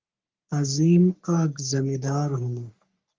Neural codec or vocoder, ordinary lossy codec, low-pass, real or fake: codec, 44.1 kHz, 3.4 kbps, Pupu-Codec; Opus, 32 kbps; 7.2 kHz; fake